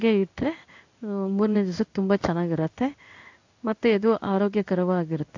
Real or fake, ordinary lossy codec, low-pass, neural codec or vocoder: fake; AAC, 48 kbps; 7.2 kHz; codec, 16 kHz in and 24 kHz out, 1 kbps, XY-Tokenizer